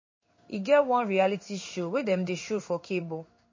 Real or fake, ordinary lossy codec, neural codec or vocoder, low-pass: fake; MP3, 32 kbps; codec, 16 kHz in and 24 kHz out, 1 kbps, XY-Tokenizer; 7.2 kHz